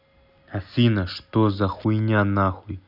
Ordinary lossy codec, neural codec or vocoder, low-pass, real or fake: none; none; 5.4 kHz; real